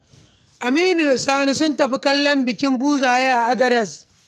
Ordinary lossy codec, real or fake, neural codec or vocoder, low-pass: none; fake; codec, 44.1 kHz, 2.6 kbps, SNAC; 14.4 kHz